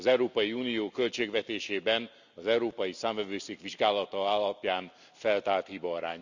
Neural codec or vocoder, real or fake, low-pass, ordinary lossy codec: none; real; 7.2 kHz; none